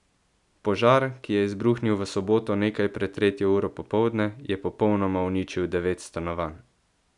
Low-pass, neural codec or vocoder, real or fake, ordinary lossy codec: 10.8 kHz; none; real; none